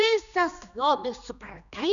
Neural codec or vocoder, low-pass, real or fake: codec, 16 kHz, 2 kbps, X-Codec, HuBERT features, trained on general audio; 7.2 kHz; fake